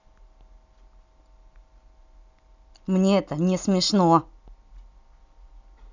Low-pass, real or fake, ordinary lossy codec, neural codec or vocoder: 7.2 kHz; real; none; none